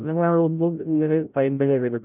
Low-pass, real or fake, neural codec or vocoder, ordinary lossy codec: 3.6 kHz; fake; codec, 16 kHz, 0.5 kbps, FreqCodec, larger model; none